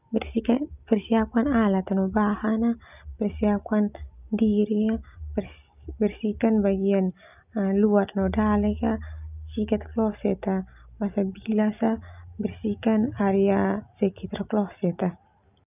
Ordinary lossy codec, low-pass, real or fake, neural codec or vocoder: none; 3.6 kHz; real; none